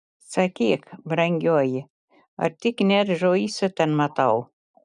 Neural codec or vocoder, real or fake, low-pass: none; real; 10.8 kHz